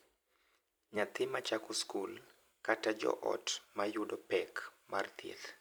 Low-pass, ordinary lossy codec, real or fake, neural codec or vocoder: none; none; fake; vocoder, 44.1 kHz, 128 mel bands every 256 samples, BigVGAN v2